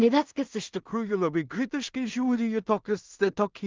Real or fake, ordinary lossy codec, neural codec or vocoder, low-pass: fake; Opus, 24 kbps; codec, 16 kHz in and 24 kHz out, 0.4 kbps, LongCat-Audio-Codec, two codebook decoder; 7.2 kHz